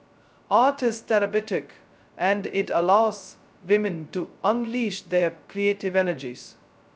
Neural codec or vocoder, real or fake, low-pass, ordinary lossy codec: codec, 16 kHz, 0.2 kbps, FocalCodec; fake; none; none